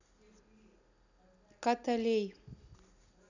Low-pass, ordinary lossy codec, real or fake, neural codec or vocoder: 7.2 kHz; none; real; none